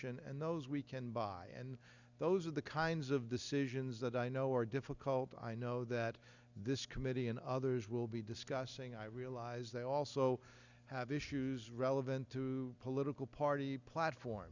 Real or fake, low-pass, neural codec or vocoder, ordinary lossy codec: real; 7.2 kHz; none; Opus, 64 kbps